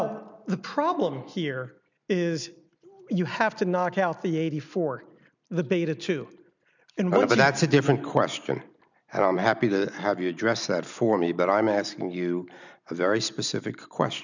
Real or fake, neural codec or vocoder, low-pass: real; none; 7.2 kHz